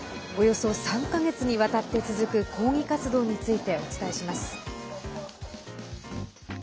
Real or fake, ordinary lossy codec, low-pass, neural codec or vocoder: real; none; none; none